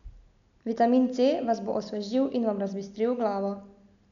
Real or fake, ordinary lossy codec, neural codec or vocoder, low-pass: real; none; none; 7.2 kHz